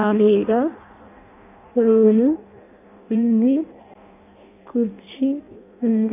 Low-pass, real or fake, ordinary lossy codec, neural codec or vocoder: 3.6 kHz; fake; none; codec, 16 kHz in and 24 kHz out, 0.6 kbps, FireRedTTS-2 codec